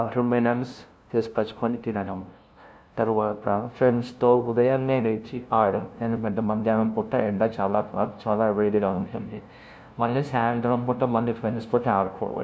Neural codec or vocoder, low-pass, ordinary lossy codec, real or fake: codec, 16 kHz, 0.5 kbps, FunCodec, trained on LibriTTS, 25 frames a second; none; none; fake